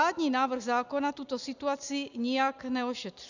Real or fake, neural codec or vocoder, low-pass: real; none; 7.2 kHz